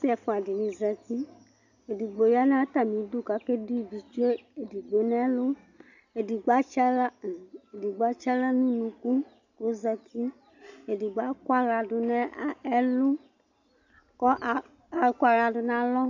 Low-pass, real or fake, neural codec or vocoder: 7.2 kHz; real; none